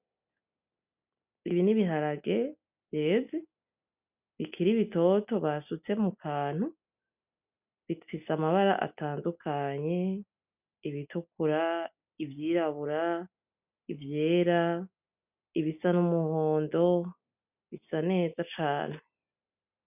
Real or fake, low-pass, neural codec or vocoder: real; 3.6 kHz; none